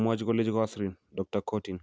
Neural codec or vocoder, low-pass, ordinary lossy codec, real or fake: none; none; none; real